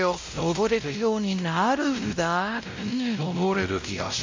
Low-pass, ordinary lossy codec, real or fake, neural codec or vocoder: 7.2 kHz; MP3, 48 kbps; fake; codec, 16 kHz, 0.5 kbps, X-Codec, WavLM features, trained on Multilingual LibriSpeech